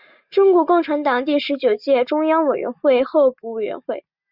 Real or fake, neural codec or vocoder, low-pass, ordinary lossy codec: real; none; 5.4 kHz; Opus, 64 kbps